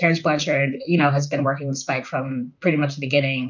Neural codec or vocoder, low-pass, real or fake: vocoder, 44.1 kHz, 128 mel bands, Pupu-Vocoder; 7.2 kHz; fake